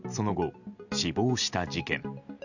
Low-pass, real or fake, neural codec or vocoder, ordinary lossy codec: 7.2 kHz; real; none; none